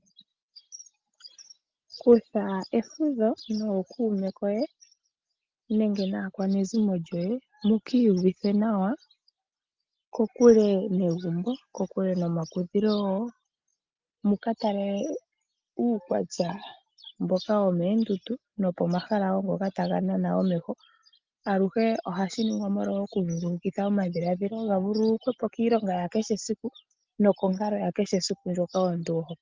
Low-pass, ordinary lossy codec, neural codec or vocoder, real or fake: 7.2 kHz; Opus, 24 kbps; none; real